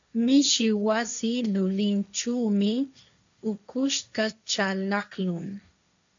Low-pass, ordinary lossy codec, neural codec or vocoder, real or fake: 7.2 kHz; MP3, 64 kbps; codec, 16 kHz, 1.1 kbps, Voila-Tokenizer; fake